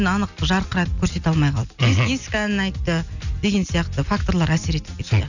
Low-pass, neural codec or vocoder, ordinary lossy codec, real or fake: 7.2 kHz; none; none; real